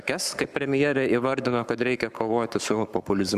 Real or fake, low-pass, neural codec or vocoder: fake; 14.4 kHz; codec, 44.1 kHz, 7.8 kbps, Pupu-Codec